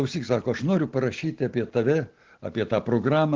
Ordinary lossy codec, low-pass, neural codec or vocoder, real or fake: Opus, 16 kbps; 7.2 kHz; none; real